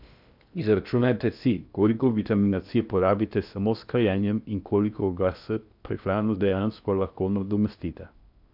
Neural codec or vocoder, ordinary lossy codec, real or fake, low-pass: codec, 16 kHz in and 24 kHz out, 0.6 kbps, FocalCodec, streaming, 4096 codes; none; fake; 5.4 kHz